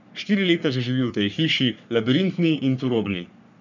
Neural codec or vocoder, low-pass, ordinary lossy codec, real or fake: codec, 44.1 kHz, 3.4 kbps, Pupu-Codec; 7.2 kHz; none; fake